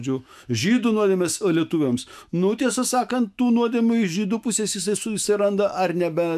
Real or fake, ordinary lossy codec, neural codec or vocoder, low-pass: fake; AAC, 96 kbps; autoencoder, 48 kHz, 128 numbers a frame, DAC-VAE, trained on Japanese speech; 14.4 kHz